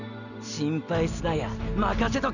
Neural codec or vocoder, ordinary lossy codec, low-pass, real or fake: none; none; 7.2 kHz; real